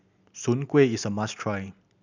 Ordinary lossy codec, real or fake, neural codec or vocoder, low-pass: none; real; none; 7.2 kHz